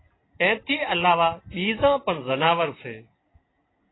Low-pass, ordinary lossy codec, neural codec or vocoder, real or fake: 7.2 kHz; AAC, 16 kbps; none; real